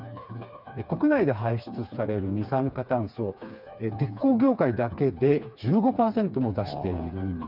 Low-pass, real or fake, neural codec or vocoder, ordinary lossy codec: 5.4 kHz; fake; codec, 16 kHz, 4 kbps, FreqCodec, smaller model; none